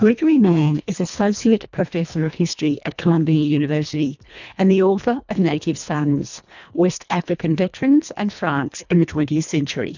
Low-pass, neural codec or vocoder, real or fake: 7.2 kHz; codec, 24 kHz, 1.5 kbps, HILCodec; fake